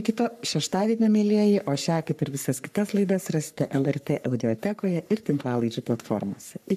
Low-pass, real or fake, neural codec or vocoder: 14.4 kHz; fake; codec, 44.1 kHz, 3.4 kbps, Pupu-Codec